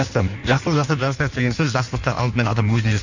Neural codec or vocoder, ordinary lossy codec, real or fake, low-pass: codec, 16 kHz in and 24 kHz out, 1.1 kbps, FireRedTTS-2 codec; none; fake; 7.2 kHz